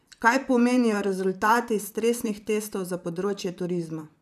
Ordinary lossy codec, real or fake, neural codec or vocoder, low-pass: none; fake; vocoder, 44.1 kHz, 128 mel bands, Pupu-Vocoder; 14.4 kHz